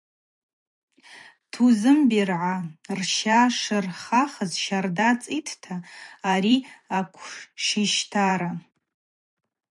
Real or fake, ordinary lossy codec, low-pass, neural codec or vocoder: fake; MP3, 96 kbps; 10.8 kHz; vocoder, 44.1 kHz, 128 mel bands every 512 samples, BigVGAN v2